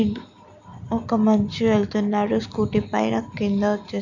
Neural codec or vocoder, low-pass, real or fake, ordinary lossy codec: none; 7.2 kHz; real; none